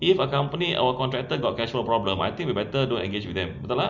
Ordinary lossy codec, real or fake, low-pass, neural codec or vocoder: none; real; 7.2 kHz; none